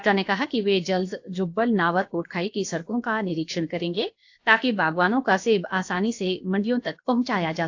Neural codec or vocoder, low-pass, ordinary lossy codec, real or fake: codec, 16 kHz, about 1 kbps, DyCAST, with the encoder's durations; 7.2 kHz; AAC, 48 kbps; fake